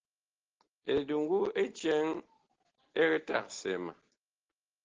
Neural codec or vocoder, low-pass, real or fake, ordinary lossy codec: none; 7.2 kHz; real; Opus, 16 kbps